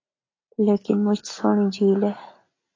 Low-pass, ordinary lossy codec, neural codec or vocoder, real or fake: 7.2 kHz; AAC, 32 kbps; none; real